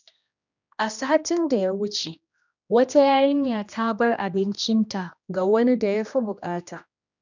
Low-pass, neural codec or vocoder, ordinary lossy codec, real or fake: 7.2 kHz; codec, 16 kHz, 1 kbps, X-Codec, HuBERT features, trained on general audio; none; fake